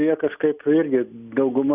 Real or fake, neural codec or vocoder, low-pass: real; none; 3.6 kHz